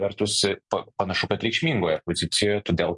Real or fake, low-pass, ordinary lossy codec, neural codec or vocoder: real; 10.8 kHz; MP3, 64 kbps; none